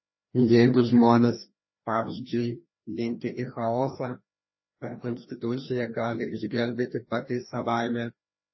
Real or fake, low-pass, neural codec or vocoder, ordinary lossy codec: fake; 7.2 kHz; codec, 16 kHz, 1 kbps, FreqCodec, larger model; MP3, 24 kbps